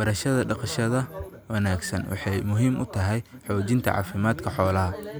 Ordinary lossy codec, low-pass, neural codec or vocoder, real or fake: none; none; none; real